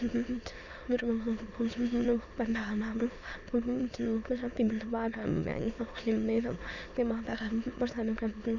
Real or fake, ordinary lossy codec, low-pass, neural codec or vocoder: fake; none; 7.2 kHz; autoencoder, 22.05 kHz, a latent of 192 numbers a frame, VITS, trained on many speakers